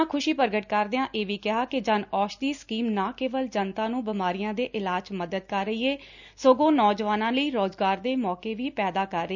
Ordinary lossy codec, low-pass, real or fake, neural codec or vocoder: none; 7.2 kHz; real; none